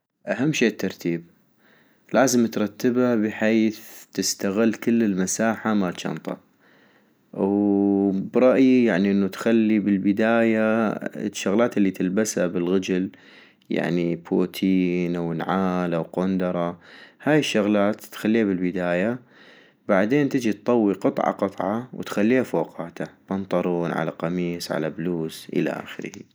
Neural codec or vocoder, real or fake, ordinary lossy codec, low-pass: none; real; none; none